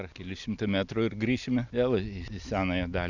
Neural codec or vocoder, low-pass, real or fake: none; 7.2 kHz; real